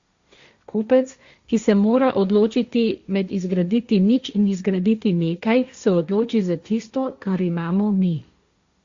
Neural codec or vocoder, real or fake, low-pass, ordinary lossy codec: codec, 16 kHz, 1.1 kbps, Voila-Tokenizer; fake; 7.2 kHz; Opus, 64 kbps